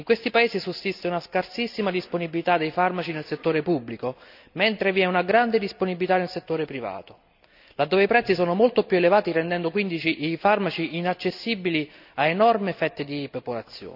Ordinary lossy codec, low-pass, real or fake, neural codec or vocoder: none; 5.4 kHz; real; none